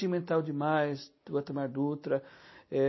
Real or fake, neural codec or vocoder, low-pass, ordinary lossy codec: real; none; 7.2 kHz; MP3, 24 kbps